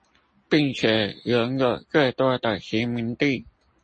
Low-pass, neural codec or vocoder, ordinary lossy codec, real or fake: 10.8 kHz; none; MP3, 32 kbps; real